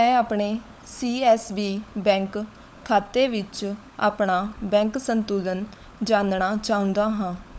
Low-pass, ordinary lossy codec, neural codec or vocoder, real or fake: none; none; codec, 16 kHz, 16 kbps, FunCodec, trained on LibriTTS, 50 frames a second; fake